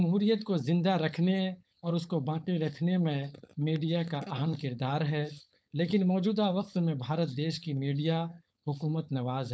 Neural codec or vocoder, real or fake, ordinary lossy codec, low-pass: codec, 16 kHz, 4.8 kbps, FACodec; fake; none; none